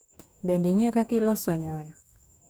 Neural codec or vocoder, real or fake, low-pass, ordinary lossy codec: codec, 44.1 kHz, 2.6 kbps, DAC; fake; none; none